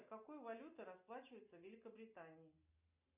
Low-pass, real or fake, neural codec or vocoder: 3.6 kHz; real; none